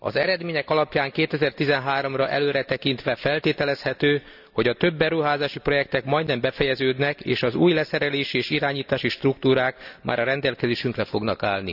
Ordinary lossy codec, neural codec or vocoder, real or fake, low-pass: none; none; real; 5.4 kHz